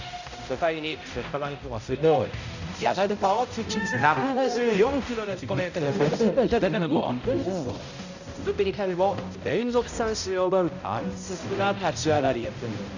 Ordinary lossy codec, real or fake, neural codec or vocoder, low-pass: none; fake; codec, 16 kHz, 0.5 kbps, X-Codec, HuBERT features, trained on balanced general audio; 7.2 kHz